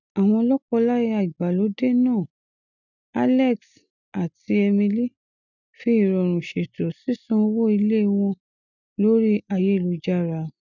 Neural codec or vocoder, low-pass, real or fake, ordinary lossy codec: none; 7.2 kHz; real; none